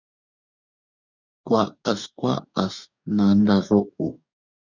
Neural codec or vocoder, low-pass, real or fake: codec, 44.1 kHz, 2.6 kbps, DAC; 7.2 kHz; fake